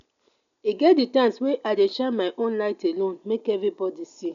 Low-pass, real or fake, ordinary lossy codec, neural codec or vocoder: 7.2 kHz; real; none; none